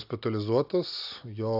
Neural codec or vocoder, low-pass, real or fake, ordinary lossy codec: none; 5.4 kHz; real; AAC, 48 kbps